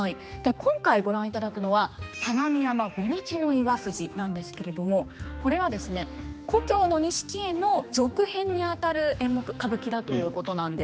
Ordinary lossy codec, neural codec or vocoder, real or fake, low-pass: none; codec, 16 kHz, 2 kbps, X-Codec, HuBERT features, trained on general audio; fake; none